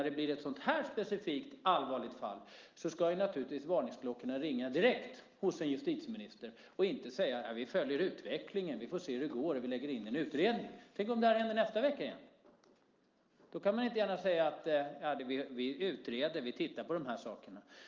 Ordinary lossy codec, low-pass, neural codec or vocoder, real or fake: Opus, 24 kbps; 7.2 kHz; none; real